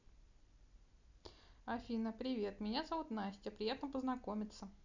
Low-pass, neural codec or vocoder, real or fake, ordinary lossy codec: 7.2 kHz; none; real; none